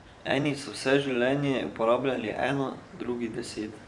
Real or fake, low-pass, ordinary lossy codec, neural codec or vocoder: fake; 10.8 kHz; none; vocoder, 24 kHz, 100 mel bands, Vocos